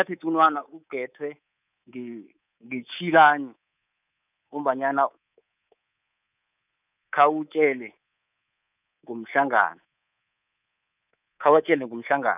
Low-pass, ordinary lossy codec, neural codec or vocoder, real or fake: 3.6 kHz; none; codec, 24 kHz, 3.1 kbps, DualCodec; fake